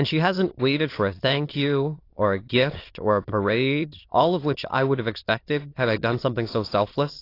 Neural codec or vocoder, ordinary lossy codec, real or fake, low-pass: autoencoder, 22.05 kHz, a latent of 192 numbers a frame, VITS, trained on many speakers; AAC, 32 kbps; fake; 5.4 kHz